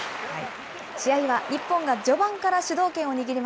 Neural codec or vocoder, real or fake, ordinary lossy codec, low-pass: none; real; none; none